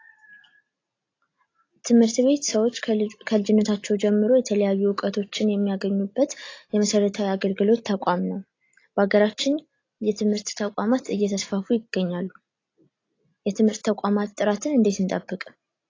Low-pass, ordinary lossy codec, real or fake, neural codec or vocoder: 7.2 kHz; AAC, 32 kbps; real; none